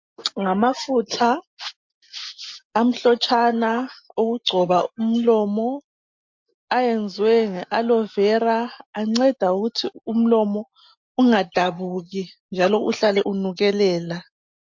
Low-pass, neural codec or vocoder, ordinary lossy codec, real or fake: 7.2 kHz; none; MP3, 48 kbps; real